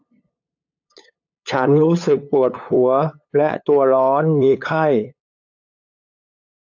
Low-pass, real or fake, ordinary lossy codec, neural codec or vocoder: 7.2 kHz; fake; AAC, 48 kbps; codec, 16 kHz, 8 kbps, FunCodec, trained on LibriTTS, 25 frames a second